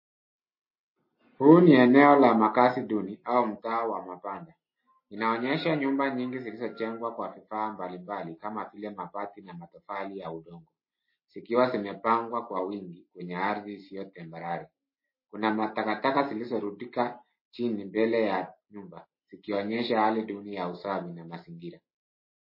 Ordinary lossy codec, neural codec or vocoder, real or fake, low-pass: MP3, 24 kbps; none; real; 5.4 kHz